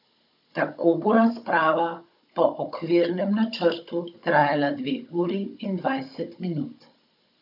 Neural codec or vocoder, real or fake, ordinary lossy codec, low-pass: codec, 16 kHz, 16 kbps, FunCodec, trained on Chinese and English, 50 frames a second; fake; none; 5.4 kHz